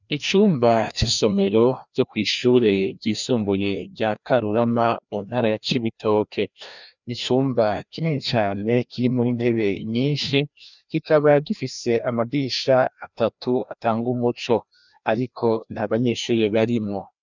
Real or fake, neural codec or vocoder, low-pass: fake; codec, 16 kHz, 1 kbps, FreqCodec, larger model; 7.2 kHz